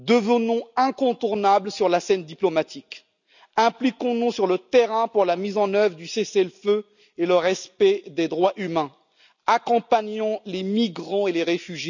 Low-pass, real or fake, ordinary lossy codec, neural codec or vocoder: 7.2 kHz; real; none; none